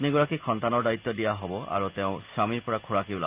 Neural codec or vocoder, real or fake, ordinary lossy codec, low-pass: none; real; Opus, 24 kbps; 3.6 kHz